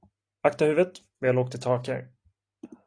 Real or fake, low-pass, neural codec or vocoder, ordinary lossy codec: real; 9.9 kHz; none; AAC, 48 kbps